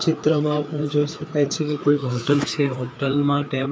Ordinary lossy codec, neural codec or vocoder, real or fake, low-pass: none; codec, 16 kHz, 4 kbps, FreqCodec, larger model; fake; none